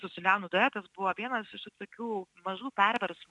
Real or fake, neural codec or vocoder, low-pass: real; none; 10.8 kHz